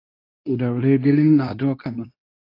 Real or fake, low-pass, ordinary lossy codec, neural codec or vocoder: fake; 5.4 kHz; AAC, 32 kbps; codec, 24 kHz, 0.9 kbps, WavTokenizer, medium speech release version 2